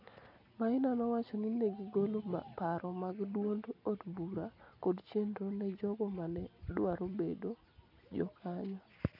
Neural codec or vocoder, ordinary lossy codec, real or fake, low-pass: none; none; real; 5.4 kHz